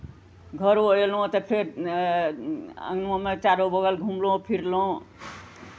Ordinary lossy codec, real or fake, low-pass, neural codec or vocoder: none; real; none; none